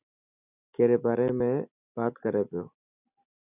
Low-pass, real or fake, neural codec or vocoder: 3.6 kHz; real; none